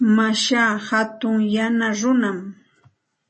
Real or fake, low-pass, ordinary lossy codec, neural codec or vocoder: real; 10.8 kHz; MP3, 32 kbps; none